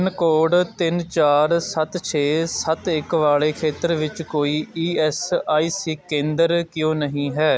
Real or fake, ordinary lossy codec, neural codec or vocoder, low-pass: real; none; none; none